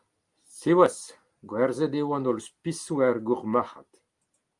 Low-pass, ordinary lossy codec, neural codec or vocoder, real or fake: 10.8 kHz; Opus, 32 kbps; none; real